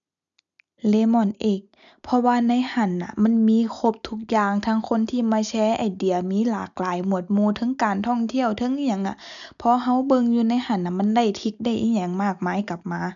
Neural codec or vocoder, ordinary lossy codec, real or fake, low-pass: none; none; real; 7.2 kHz